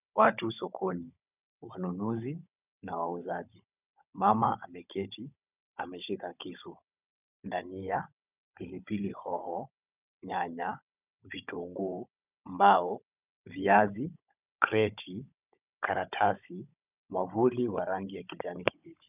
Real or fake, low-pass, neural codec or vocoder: fake; 3.6 kHz; codec, 16 kHz, 16 kbps, FunCodec, trained on Chinese and English, 50 frames a second